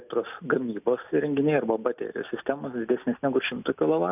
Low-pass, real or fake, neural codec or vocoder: 3.6 kHz; real; none